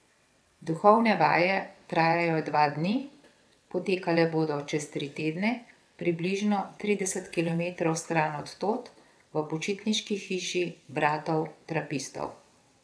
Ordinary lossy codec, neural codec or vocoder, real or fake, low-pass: none; vocoder, 22.05 kHz, 80 mel bands, WaveNeXt; fake; none